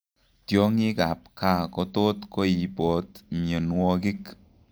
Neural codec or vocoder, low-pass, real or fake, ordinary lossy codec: none; none; real; none